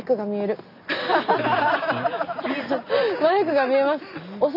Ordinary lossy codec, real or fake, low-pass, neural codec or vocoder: none; real; 5.4 kHz; none